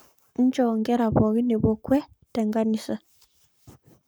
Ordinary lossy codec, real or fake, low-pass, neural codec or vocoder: none; fake; none; codec, 44.1 kHz, 7.8 kbps, Pupu-Codec